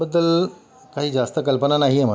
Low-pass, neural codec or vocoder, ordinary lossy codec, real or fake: none; none; none; real